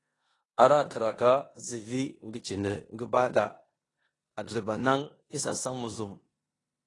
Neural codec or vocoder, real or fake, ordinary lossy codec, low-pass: codec, 16 kHz in and 24 kHz out, 0.9 kbps, LongCat-Audio-Codec, four codebook decoder; fake; AAC, 32 kbps; 10.8 kHz